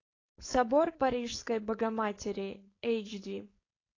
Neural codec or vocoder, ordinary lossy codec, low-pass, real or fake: codec, 16 kHz, 4.8 kbps, FACodec; AAC, 32 kbps; 7.2 kHz; fake